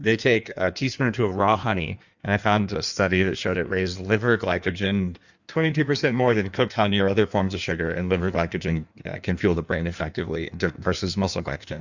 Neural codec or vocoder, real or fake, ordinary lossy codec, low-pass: codec, 16 kHz in and 24 kHz out, 1.1 kbps, FireRedTTS-2 codec; fake; Opus, 64 kbps; 7.2 kHz